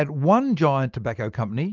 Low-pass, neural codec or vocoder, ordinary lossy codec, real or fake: 7.2 kHz; none; Opus, 24 kbps; real